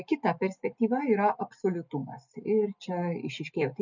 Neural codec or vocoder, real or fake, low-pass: none; real; 7.2 kHz